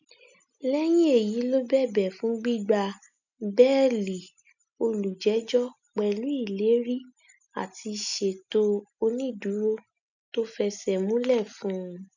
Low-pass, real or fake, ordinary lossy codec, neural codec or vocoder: 7.2 kHz; real; none; none